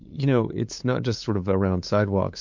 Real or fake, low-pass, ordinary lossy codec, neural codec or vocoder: fake; 7.2 kHz; MP3, 48 kbps; codec, 16 kHz, 8 kbps, FunCodec, trained on LibriTTS, 25 frames a second